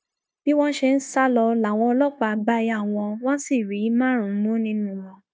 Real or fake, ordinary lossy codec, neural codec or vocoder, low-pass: fake; none; codec, 16 kHz, 0.9 kbps, LongCat-Audio-Codec; none